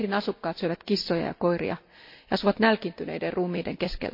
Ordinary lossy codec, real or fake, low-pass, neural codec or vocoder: MP3, 32 kbps; real; 5.4 kHz; none